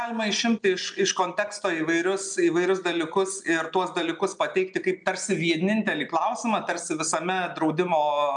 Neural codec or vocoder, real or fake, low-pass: none; real; 9.9 kHz